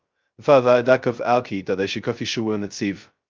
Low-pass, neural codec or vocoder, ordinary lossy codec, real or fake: 7.2 kHz; codec, 16 kHz, 0.2 kbps, FocalCodec; Opus, 32 kbps; fake